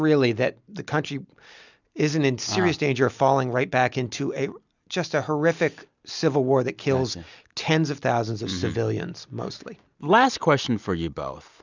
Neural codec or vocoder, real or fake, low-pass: none; real; 7.2 kHz